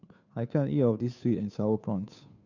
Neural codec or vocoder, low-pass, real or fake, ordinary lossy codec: codec, 16 kHz, 2 kbps, FunCodec, trained on Chinese and English, 25 frames a second; 7.2 kHz; fake; none